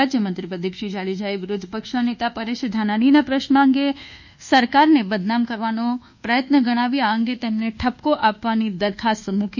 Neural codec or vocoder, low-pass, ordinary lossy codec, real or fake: codec, 24 kHz, 1.2 kbps, DualCodec; 7.2 kHz; none; fake